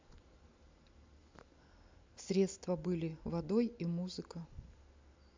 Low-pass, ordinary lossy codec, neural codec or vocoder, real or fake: 7.2 kHz; MP3, 64 kbps; none; real